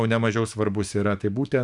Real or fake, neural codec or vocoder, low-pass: fake; autoencoder, 48 kHz, 128 numbers a frame, DAC-VAE, trained on Japanese speech; 10.8 kHz